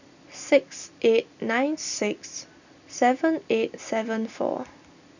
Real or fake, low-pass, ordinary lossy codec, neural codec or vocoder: real; 7.2 kHz; none; none